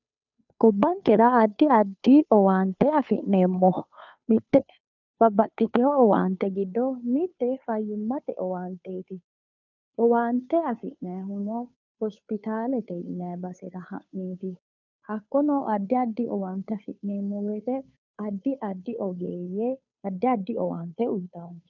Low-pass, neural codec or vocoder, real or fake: 7.2 kHz; codec, 16 kHz, 2 kbps, FunCodec, trained on Chinese and English, 25 frames a second; fake